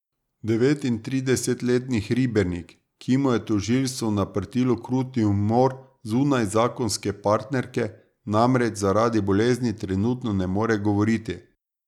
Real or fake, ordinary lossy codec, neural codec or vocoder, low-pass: real; none; none; 19.8 kHz